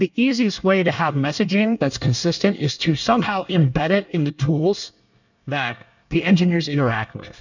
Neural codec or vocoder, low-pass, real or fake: codec, 24 kHz, 1 kbps, SNAC; 7.2 kHz; fake